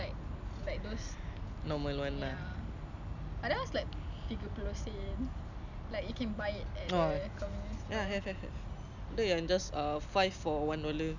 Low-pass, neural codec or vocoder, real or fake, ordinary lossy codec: 7.2 kHz; none; real; none